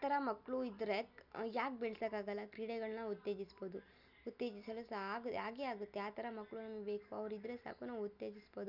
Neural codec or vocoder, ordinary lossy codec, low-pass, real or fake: none; none; 5.4 kHz; real